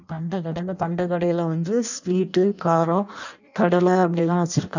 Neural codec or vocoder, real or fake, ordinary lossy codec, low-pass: codec, 16 kHz in and 24 kHz out, 0.6 kbps, FireRedTTS-2 codec; fake; none; 7.2 kHz